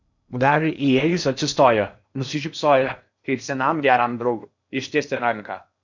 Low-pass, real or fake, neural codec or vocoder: 7.2 kHz; fake; codec, 16 kHz in and 24 kHz out, 0.8 kbps, FocalCodec, streaming, 65536 codes